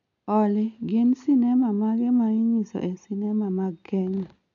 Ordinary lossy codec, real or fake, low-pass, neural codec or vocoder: none; real; 7.2 kHz; none